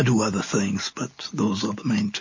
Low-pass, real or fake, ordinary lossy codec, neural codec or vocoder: 7.2 kHz; real; MP3, 32 kbps; none